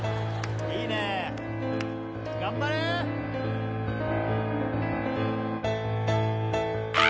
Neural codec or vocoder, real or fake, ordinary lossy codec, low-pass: none; real; none; none